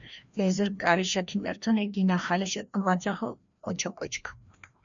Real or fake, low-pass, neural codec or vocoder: fake; 7.2 kHz; codec, 16 kHz, 1 kbps, FreqCodec, larger model